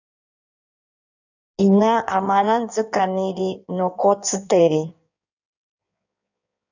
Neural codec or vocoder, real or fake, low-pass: codec, 16 kHz in and 24 kHz out, 1.1 kbps, FireRedTTS-2 codec; fake; 7.2 kHz